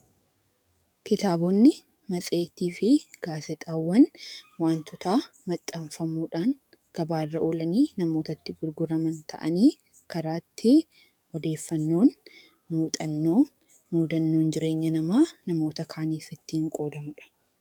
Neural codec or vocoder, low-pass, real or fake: codec, 44.1 kHz, 7.8 kbps, DAC; 19.8 kHz; fake